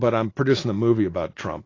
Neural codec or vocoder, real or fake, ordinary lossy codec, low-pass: none; real; AAC, 32 kbps; 7.2 kHz